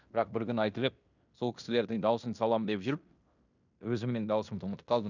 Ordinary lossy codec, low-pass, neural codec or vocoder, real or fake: none; 7.2 kHz; codec, 16 kHz in and 24 kHz out, 0.9 kbps, LongCat-Audio-Codec, fine tuned four codebook decoder; fake